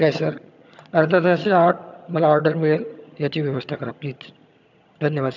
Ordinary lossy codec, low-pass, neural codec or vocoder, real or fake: none; 7.2 kHz; vocoder, 22.05 kHz, 80 mel bands, HiFi-GAN; fake